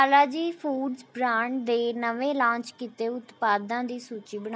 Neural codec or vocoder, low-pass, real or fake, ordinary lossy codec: none; none; real; none